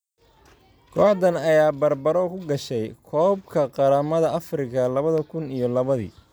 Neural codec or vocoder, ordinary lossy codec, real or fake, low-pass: none; none; real; none